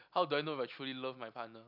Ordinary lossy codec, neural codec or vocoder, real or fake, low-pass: none; none; real; 5.4 kHz